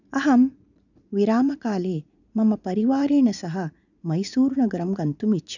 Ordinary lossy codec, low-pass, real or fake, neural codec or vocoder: none; 7.2 kHz; fake; vocoder, 44.1 kHz, 80 mel bands, Vocos